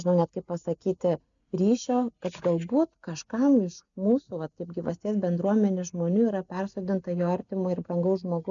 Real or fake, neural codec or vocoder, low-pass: real; none; 7.2 kHz